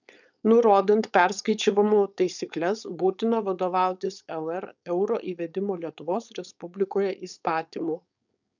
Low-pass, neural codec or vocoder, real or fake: 7.2 kHz; codec, 16 kHz, 4.8 kbps, FACodec; fake